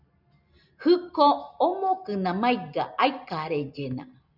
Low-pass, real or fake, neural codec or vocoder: 5.4 kHz; real; none